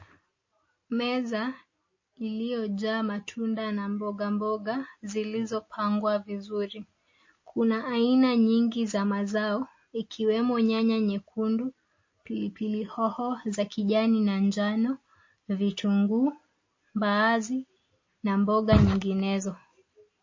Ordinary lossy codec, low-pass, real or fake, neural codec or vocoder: MP3, 32 kbps; 7.2 kHz; real; none